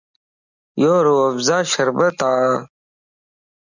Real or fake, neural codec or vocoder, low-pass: real; none; 7.2 kHz